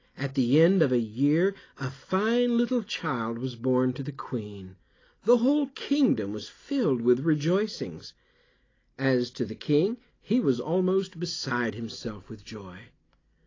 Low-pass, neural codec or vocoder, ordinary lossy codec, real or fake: 7.2 kHz; none; AAC, 32 kbps; real